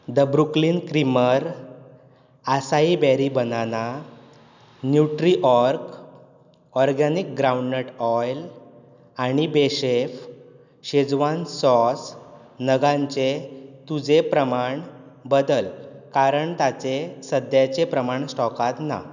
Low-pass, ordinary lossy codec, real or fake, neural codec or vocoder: 7.2 kHz; none; real; none